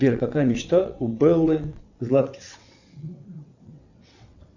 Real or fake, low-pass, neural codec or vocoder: fake; 7.2 kHz; vocoder, 22.05 kHz, 80 mel bands, WaveNeXt